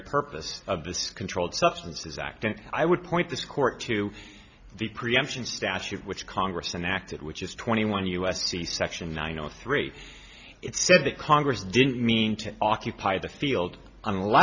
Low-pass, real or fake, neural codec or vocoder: 7.2 kHz; real; none